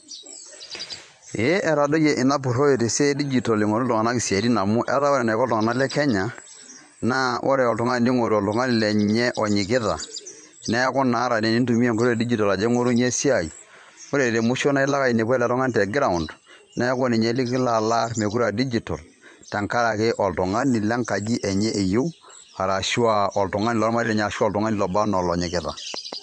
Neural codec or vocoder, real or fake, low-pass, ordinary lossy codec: vocoder, 24 kHz, 100 mel bands, Vocos; fake; 9.9 kHz; MP3, 64 kbps